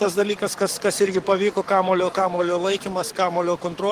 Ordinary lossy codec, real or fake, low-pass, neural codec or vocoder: Opus, 24 kbps; fake; 14.4 kHz; codec, 44.1 kHz, 7.8 kbps, Pupu-Codec